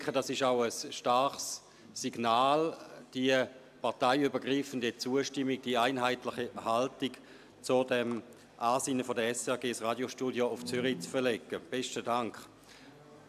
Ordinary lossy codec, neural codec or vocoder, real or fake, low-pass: AAC, 96 kbps; none; real; 14.4 kHz